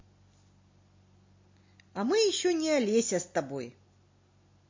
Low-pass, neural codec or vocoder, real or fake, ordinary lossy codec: 7.2 kHz; none; real; MP3, 32 kbps